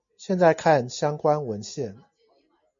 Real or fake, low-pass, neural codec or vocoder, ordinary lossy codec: real; 7.2 kHz; none; MP3, 48 kbps